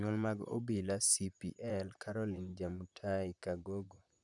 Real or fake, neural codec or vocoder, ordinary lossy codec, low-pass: fake; vocoder, 44.1 kHz, 128 mel bands, Pupu-Vocoder; none; 10.8 kHz